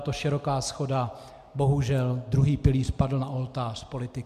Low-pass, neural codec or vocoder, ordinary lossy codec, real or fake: 14.4 kHz; none; MP3, 96 kbps; real